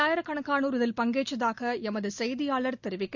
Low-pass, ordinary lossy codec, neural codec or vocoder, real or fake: 7.2 kHz; none; none; real